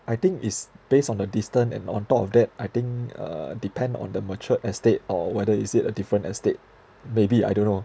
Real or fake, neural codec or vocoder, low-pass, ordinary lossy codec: real; none; none; none